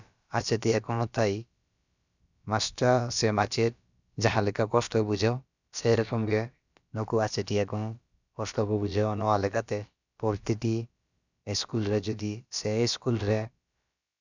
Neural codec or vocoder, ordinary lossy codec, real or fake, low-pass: codec, 16 kHz, about 1 kbps, DyCAST, with the encoder's durations; none; fake; 7.2 kHz